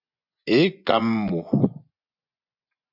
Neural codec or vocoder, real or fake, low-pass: vocoder, 24 kHz, 100 mel bands, Vocos; fake; 5.4 kHz